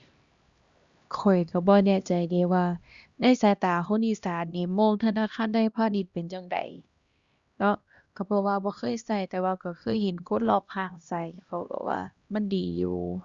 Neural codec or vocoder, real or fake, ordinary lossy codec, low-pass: codec, 16 kHz, 1 kbps, X-Codec, HuBERT features, trained on LibriSpeech; fake; Opus, 64 kbps; 7.2 kHz